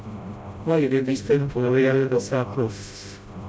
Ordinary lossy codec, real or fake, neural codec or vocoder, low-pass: none; fake; codec, 16 kHz, 0.5 kbps, FreqCodec, smaller model; none